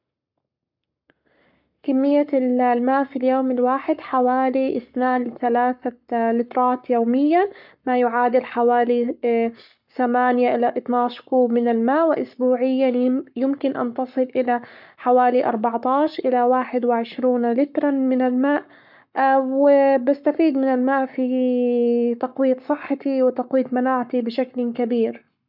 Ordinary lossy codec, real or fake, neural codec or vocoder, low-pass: none; fake; codec, 44.1 kHz, 7.8 kbps, Pupu-Codec; 5.4 kHz